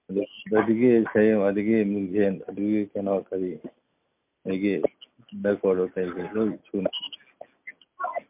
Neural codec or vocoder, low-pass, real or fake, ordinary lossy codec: none; 3.6 kHz; real; MP3, 32 kbps